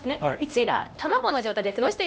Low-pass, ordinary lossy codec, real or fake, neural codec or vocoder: none; none; fake; codec, 16 kHz, 1 kbps, X-Codec, HuBERT features, trained on LibriSpeech